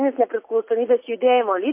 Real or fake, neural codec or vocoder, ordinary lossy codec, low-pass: fake; vocoder, 44.1 kHz, 80 mel bands, Vocos; MP3, 24 kbps; 3.6 kHz